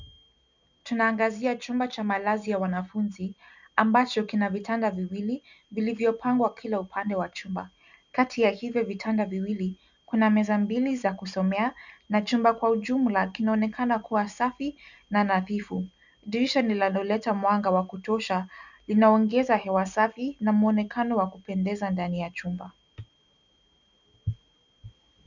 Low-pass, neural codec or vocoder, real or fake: 7.2 kHz; none; real